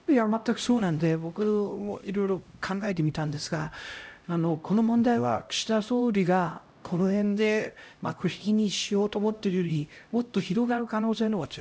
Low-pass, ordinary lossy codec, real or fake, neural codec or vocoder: none; none; fake; codec, 16 kHz, 0.5 kbps, X-Codec, HuBERT features, trained on LibriSpeech